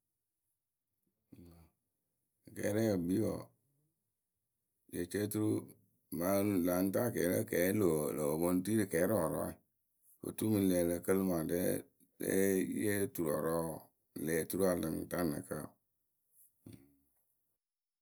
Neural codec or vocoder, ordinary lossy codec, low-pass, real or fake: none; none; none; real